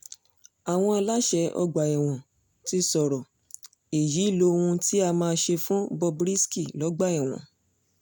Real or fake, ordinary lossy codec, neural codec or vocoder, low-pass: real; none; none; none